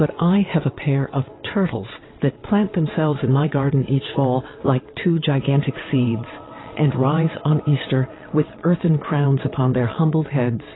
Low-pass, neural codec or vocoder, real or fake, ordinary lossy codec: 7.2 kHz; vocoder, 22.05 kHz, 80 mel bands, WaveNeXt; fake; AAC, 16 kbps